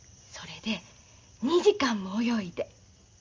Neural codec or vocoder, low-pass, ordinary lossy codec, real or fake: none; 7.2 kHz; Opus, 32 kbps; real